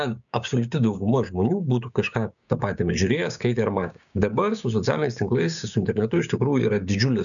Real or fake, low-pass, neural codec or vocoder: fake; 7.2 kHz; codec, 16 kHz, 6 kbps, DAC